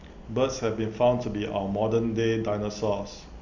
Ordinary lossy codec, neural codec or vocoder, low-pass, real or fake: none; none; 7.2 kHz; real